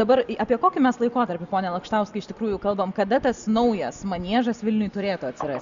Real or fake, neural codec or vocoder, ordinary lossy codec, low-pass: real; none; Opus, 64 kbps; 7.2 kHz